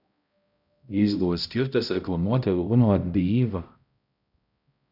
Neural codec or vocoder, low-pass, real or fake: codec, 16 kHz, 0.5 kbps, X-Codec, HuBERT features, trained on balanced general audio; 5.4 kHz; fake